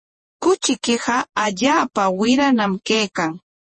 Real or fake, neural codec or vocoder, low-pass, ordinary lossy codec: fake; vocoder, 48 kHz, 128 mel bands, Vocos; 10.8 kHz; MP3, 32 kbps